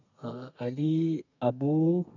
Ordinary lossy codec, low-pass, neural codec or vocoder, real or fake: none; 7.2 kHz; codec, 32 kHz, 1.9 kbps, SNAC; fake